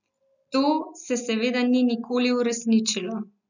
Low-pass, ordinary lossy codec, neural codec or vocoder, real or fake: 7.2 kHz; none; none; real